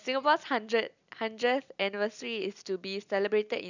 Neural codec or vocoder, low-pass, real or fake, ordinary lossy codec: none; 7.2 kHz; real; none